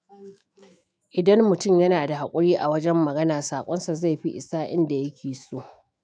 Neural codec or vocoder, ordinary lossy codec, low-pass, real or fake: autoencoder, 48 kHz, 128 numbers a frame, DAC-VAE, trained on Japanese speech; none; 9.9 kHz; fake